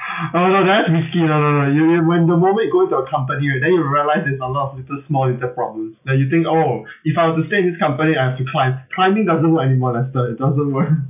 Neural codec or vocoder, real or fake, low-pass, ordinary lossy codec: none; real; 3.6 kHz; none